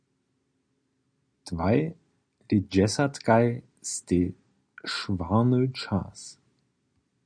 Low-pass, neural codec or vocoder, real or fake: 9.9 kHz; none; real